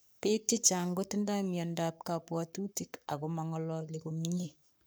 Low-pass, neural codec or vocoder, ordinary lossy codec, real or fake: none; codec, 44.1 kHz, 7.8 kbps, Pupu-Codec; none; fake